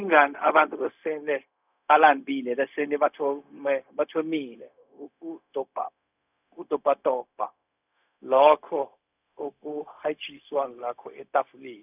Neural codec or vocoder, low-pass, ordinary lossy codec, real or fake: codec, 16 kHz, 0.4 kbps, LongCat-Audio-Codec; 3.6 kHz; none; fake